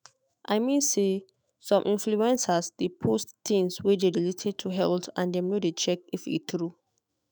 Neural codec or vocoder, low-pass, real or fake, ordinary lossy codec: autoencoder, 48 kHz, 128 numbers a frame, DAC-VAE, trained on Japanese speech; none; fake; none